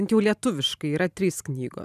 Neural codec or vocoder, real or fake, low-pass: vocoder, 44.1 kHz, 128 mel bands every 512 samples, BigVGAN v2; fake; 14.4 kHz